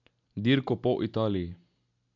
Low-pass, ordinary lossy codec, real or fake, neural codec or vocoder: 7.2 kHz; none; real; none